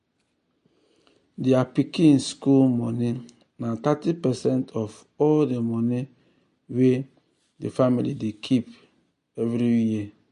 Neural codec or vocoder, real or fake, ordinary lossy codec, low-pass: none; real; MP3, 48 kbps; 14.4 kHz